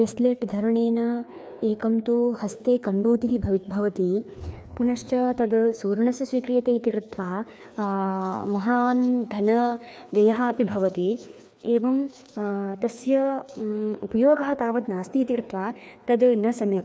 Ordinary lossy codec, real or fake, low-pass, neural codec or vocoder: none; fake; none; codec, 16 kHz, 2 kbps, FreqCodec, larger model